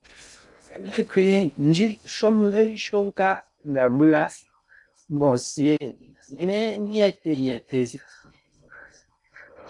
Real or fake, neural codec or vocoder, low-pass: fake; codec, 16 kHz in and 24 kHz out, 0.6 kbps, FocalCodec, streaming, 2048 codes; 10.8 kHz